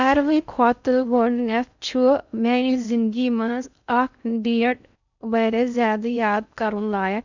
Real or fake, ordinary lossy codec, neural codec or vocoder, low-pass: fake; none; codec, 16 kHz in and 24 kHz out, 0.6 kbps, FocalCodec, streaming, 2048 codes; 7.2 kHz